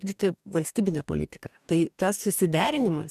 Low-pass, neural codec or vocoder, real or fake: 14.4 kHz; codec, 44.1 kHz, 2.6 kbps, DAC; fake